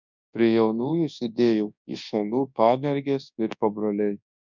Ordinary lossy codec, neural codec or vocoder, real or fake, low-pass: MP3, 64 kbps; codec, 24 kHz, 0.9 kbps, WavTokenizer, large speech release; fake; 7.2 kHz